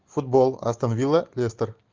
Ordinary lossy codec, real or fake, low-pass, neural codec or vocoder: Opus, 32 kbps; real; 7.2 kHz; none